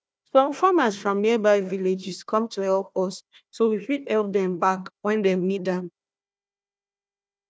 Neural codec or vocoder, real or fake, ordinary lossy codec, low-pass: codec, 16 kHz, 1 kbps, FunCodec, trained on Chinese and English, 50 frames a second; fake; none; none